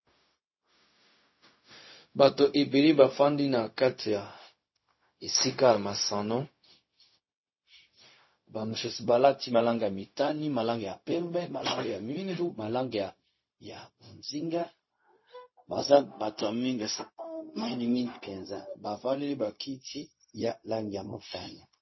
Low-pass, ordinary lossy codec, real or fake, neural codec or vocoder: 7.2 kHz; MP3, 24 kbps; fake; codec, 16 kHz, 0.4 kbps, LongCat-Audio-Codec